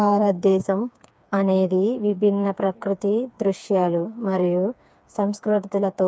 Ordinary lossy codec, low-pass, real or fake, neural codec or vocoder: none; none; fake; codec, 16 kHz, 4 kbps, FreqCodec, smaller model